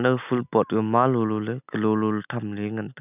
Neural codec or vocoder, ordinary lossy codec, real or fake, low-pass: none; none; real; 3.6 kHz